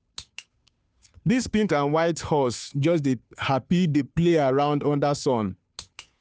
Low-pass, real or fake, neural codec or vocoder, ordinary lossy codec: none; fake; codec, 16 kHz, 2 kbps, FunCodec, trained on Chinese and English, 25 frames a second; none